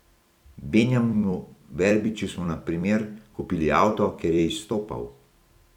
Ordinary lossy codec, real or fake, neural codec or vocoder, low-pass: none; fake; vocoder, 44.1 kHz, 128 mel bands every 256 samples, BigVGAN v2; 19.8 kHz